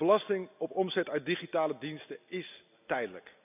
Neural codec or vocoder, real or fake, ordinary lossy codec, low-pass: none; real; none; 3.6 kHz